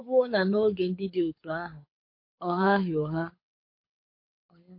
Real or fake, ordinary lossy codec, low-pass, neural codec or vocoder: fake; MP3, 32 kbps; 5.4 kHz; codec, 24 kHz, 6 kbps, HILCodec